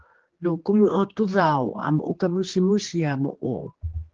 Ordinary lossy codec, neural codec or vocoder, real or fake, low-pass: Opus, 16 kbps; codec, 16 kHz, 2 kbps, X-Codec, HuBERT features, trained on general audio; fake; 7.2 kHz